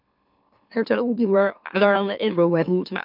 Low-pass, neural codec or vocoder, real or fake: 5.4 kHz; autoencoder, 44.1 kHz, a latent of 192 numbers a frame, MeloTTS; fake